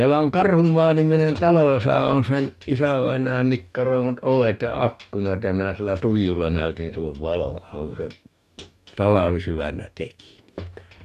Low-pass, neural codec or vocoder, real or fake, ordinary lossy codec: 14.4 kHz; codec, 44.1 kHz, 2.6 kbps, DAC; fake; none